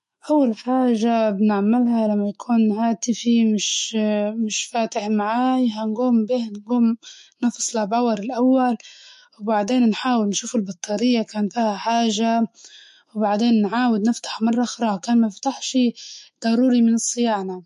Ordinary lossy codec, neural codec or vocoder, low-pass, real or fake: MP3, 48 kbps; autoencoder, 48 kHz, 128 numbers a frame, DAC-VAE, trained on Japanese speech; 14.4 kHz; fake